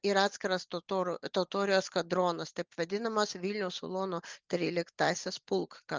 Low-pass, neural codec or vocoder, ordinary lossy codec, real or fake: 7.2 kHz; none; Opus, 24 kbps; real